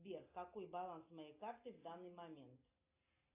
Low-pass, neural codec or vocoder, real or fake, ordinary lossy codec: 3.6 kHz; none; real; AAC, 32 kbps